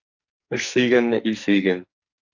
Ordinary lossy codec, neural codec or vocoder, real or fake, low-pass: AAC, 48 kbps; codec, 44.1 kHz, 2.6 kbps, SNAC; fake; 7.2 kHz